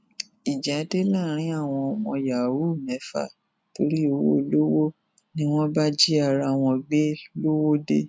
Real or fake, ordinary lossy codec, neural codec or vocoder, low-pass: real; none; none; none